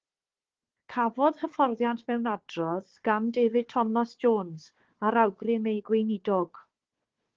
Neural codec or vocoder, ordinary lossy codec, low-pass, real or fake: codec, 16 kHz, 4 kbps, FunCodec, trained on Chinese and English, 50 frames a second; Opus, 16 kbps; 7.2 kHz; fake